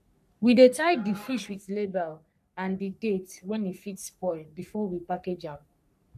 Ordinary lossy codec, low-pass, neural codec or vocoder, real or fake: none; 14.4 kHz; codec, 44.1 kHz, 3.4 kbps, Pupu-Codec; fake